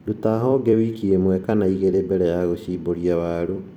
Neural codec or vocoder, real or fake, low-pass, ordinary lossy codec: vocoder, 44.1 kHz, 128 mel bands every 256 samples, BigVGAN v2; fake; 19.8 kHz; none